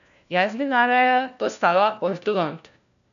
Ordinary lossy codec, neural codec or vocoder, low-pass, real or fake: none; codec, 16 kHz, 1 kbps, FunCodec, trained on LibriTTS, 50 frames a second; 7.2 kHz; fake